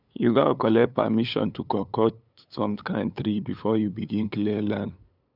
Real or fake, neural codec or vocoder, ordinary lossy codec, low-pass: fake; codec, 16 kHz, 8 kbps, FunCodec, trained on LibriTTS, 25 frames a second; none; 5.4 kHz